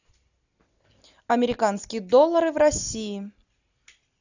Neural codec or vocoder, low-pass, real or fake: none; 7.2 kHz; real